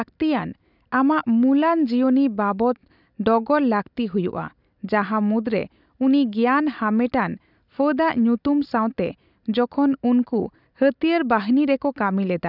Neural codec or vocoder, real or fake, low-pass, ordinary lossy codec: none; real; 5.4 kHz; none